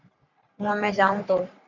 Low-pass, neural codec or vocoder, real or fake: 7.2 kHz; codec, 44.1 kHz, 3.4 kbps, Pupu-Codec; fake